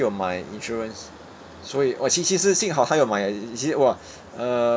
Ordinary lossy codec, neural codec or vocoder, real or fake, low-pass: none; none; real; none